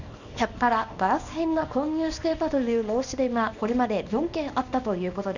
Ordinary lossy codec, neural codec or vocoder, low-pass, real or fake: none; codec, 24 kHz, 0.9 kbps, WavTokenizer, small release; 7.2 kHz; fake